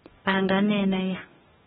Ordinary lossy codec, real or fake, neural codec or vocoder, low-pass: AAC, 16 kbps; fake; codec, 44.1 kHz, 2.6 kbps, DAC; 19.8 kHz